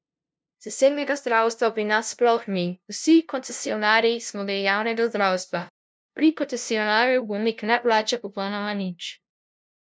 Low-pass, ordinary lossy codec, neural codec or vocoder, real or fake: none; none; codec, 16 kHz, 0.5 kbps, FunCodec, trained on LibriTTS, 25 frames a second; fake